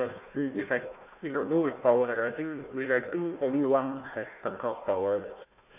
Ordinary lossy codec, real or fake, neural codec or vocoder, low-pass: none; fake; codec, 16 kHz, 1 kbps, FunCodec, trained on Chinese and English, 50 frames a second; 3.6 kHz